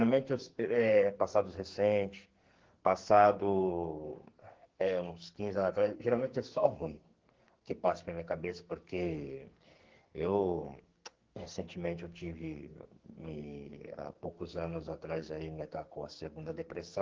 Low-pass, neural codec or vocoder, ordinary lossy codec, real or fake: 7.2 kHz; codec, 44.1 kHz, 2.6 kbps, SNAC; Opus, 16 kbps; fake